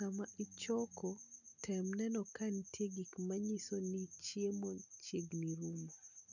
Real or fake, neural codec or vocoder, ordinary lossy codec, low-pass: real; none; none; 7.2 kHz